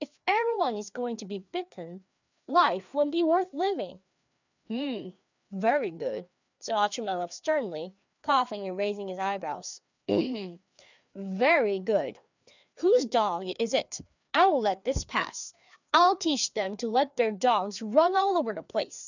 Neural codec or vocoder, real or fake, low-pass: codec, 16 kHz, 2 kbps, FreqCodec, larger model; fake; 7.2 kHz